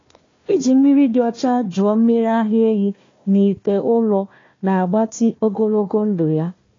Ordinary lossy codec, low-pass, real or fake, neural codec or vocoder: AAC, 32 kbps; 7.2 kHz; fake; codec, 16 kHz, 1 kbps, FunCodec, trained on Chinese and English, 50 frames a second